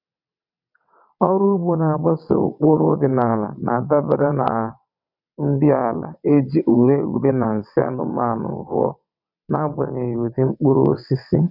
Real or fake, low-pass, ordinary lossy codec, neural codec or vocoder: fake; 5.4 kHz; none; vocoder, 22.05 kHz, 80 mel bands, WaveNeXt